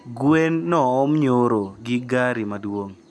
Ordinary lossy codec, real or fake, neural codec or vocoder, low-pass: none; real; none; none